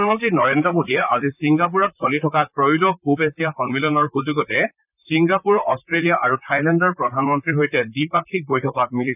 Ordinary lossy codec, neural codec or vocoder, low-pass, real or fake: none; vocoder, 44.1 kHz, 128 mel bands, Pupu-Vocoder; 3.6 kHz; fake